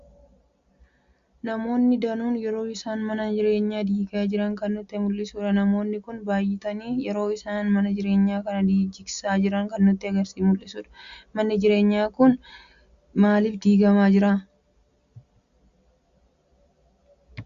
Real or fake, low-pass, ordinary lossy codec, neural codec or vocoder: real; 7.2 kHz; Opus, 64 kbps; none